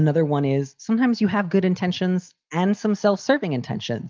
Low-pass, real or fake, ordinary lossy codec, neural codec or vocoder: 7.2 kHz; real; Opus, 24 kbps; none